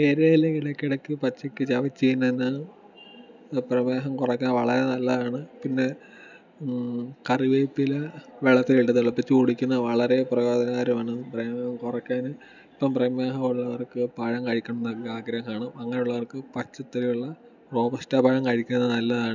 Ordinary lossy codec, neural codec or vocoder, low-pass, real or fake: none; none; 7.2 kHz; real